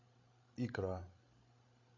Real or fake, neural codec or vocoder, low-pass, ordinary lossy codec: fake; codec, 16 kHz, 16 kbps, FreqCodec, larger model; 7.2 kHz; AAC, 32 kbps